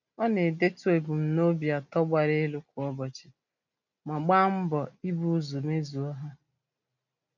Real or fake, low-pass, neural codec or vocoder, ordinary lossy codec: real; 7.2 kHz; none; none